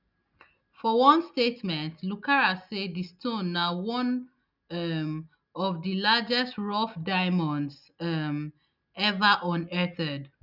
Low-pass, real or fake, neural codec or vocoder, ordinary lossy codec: 5.4 kHz; real; none; none